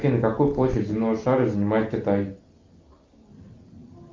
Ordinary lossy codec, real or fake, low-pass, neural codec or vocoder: Opus, 32 kbps; real; 7.2 kHz; none